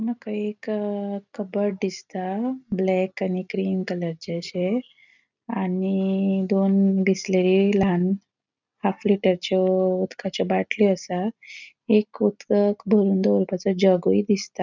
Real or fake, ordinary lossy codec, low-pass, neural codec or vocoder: real; none; 7.2 kHz; none